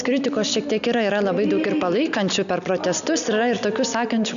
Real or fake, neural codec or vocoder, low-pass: real; none; 7.2 kHz